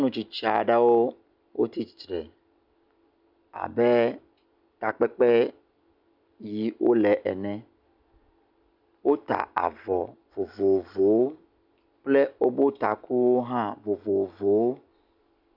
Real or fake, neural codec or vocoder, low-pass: real; none; 5.4 kHz